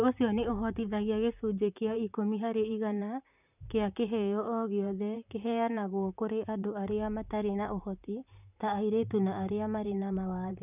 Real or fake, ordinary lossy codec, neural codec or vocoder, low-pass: fake; none; vocoder, 44.1 kHz, 128 mel bands, Pupu-Vocoder; 3.6 kHz